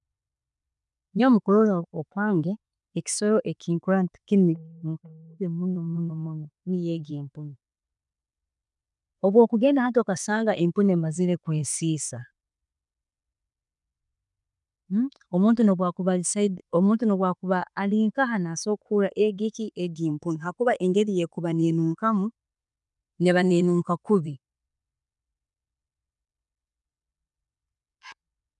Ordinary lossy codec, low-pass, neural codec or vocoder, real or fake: none; 10.8 kHz; vocoder, 24 kHz, 100 mel bands, Vocos; fake